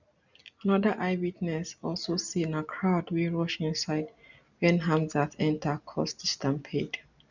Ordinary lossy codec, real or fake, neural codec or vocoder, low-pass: none; real; none; 7.2 kHz